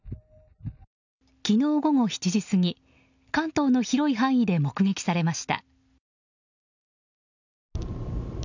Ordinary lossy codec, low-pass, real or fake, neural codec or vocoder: none; 7.2 kHz; real; none